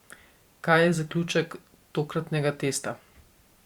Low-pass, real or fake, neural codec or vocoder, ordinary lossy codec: 19.8 kHz; fake; vocoder, 44.1 kHz, 128 mel bands every 512 samples, BigVGAN v2; Opus, 64 kbps